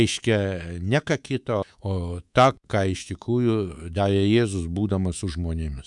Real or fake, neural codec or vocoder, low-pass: fake; codec, 24 kHz, 3.1 kbps, DualCodec; 10.8 kHz